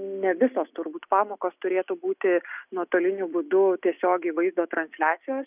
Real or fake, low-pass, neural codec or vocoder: real; 3.6 kHz; none